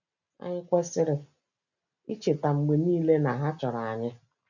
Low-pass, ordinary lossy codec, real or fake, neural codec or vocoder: 7.2 kHz; none; real; none